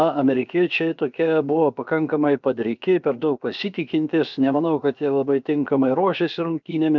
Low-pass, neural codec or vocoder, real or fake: 7.2 kHz; codec, 16 kHz, about 1 kbps, DyCAST, with the encoder's durations; fake